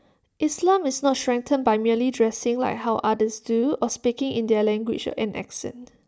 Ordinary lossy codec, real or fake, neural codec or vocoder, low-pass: none; real; none; none